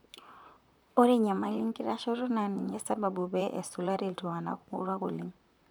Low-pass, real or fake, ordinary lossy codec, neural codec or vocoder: none; fake; none; vocoder, 44.1 kHz, 128 mel bands, Pupu-Vocoder